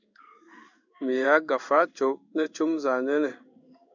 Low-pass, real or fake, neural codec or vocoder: 7.2 kHz; fake; codec, 16 kHz in and 24 kHz out, 1 kbps, XY-Tokenizer